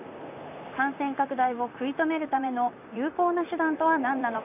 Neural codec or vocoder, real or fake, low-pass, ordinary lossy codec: vocoder, 44.1 kHz, 128 mel bands, Pupu-Vocoder; fake; 3.6 kHz; none